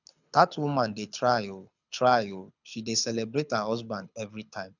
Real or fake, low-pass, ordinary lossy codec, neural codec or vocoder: fake; 7.2 kHz; none; codec, 24 kHz, 6 kbps, HILCodec